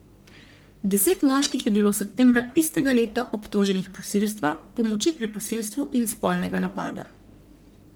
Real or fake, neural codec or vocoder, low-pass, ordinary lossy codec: fake; codec, 44.1 kHz, 1.7 kbps, Pupu-Codec; none; none